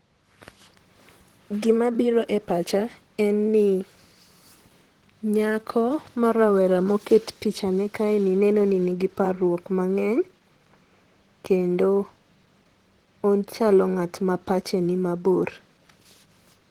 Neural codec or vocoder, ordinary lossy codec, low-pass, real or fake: vocoder, 44.1 kHz, 128 mel bands, Pupu-Vocoder; Opus, 16 kbps; 19.8 kHz; fake